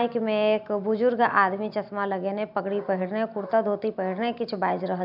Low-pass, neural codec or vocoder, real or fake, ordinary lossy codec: 5.4 kHz; none; real; none